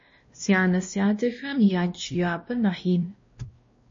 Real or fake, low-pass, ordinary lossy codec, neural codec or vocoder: fake; 7.2 kHz; MP3, 32 kbps; codec, 16 kHz, 1 kbps, X-Codec, WavLM features, trained on Multilingual LibriSpeech